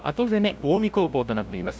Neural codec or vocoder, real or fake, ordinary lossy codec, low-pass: codec, 16 kHz, 0.5 kbps, FunCodec, trained on LibriTTS, 25 frames a second; fake; none; none